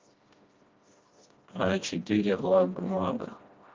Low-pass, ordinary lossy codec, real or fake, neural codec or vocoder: 7.2 kHz; Opus, 24 kbps; fake; codec, 16 kHz, 1 kbps, FreqCodec, smaller model